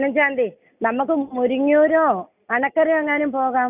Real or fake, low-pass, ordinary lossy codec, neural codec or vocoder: real; 3.6 kHz; none; none